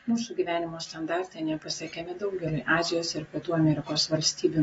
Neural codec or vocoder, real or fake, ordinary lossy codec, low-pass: none; real; AAC, 24 kbps; 19.8 kHz